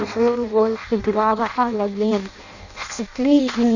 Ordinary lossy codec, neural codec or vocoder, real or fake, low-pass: none; codec, 16 kHz in and 24 kHz out, 0.6 kbps, FireRedTTS-2 codec; fake; 7.2 kHz